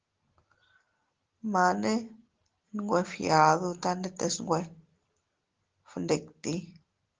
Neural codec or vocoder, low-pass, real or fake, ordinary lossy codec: none; 7.2 kHz; real; Opus, 16 kbps